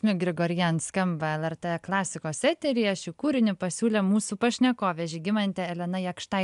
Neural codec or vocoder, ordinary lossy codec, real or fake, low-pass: none; AAC, 96 kbps; real; 10.8 kHz